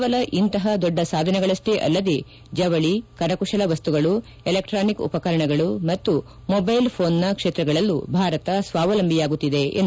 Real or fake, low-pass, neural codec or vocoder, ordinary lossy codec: real; none; none; none